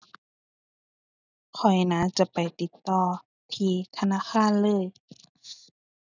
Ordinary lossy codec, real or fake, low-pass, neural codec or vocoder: none; real; 7.2 kHz; none